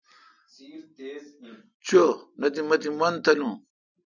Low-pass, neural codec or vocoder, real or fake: 7.2 kHz; none; real